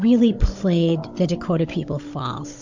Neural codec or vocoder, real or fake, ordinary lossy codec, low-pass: codec, 16 kHz, 16 kbps, FunCodec, trained on Chinese and English, 50 frames a second; fake; MP3, 48 kbps; 7.2 kHz